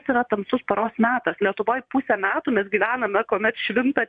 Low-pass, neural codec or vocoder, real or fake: 9.9 kHz; vocoder, 24 kHz, 100 mel bands, Vocos; fake